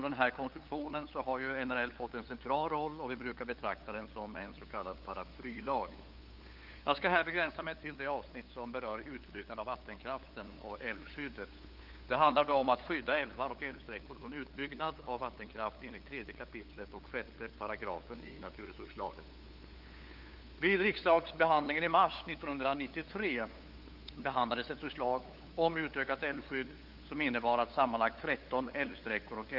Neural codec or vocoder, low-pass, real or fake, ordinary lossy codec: codec, 16 kHz, 8 kbps, FunCodec, trained on LibriTTS, 25 frames a second; 5.4 kHz; fake; Opus, 32 kbps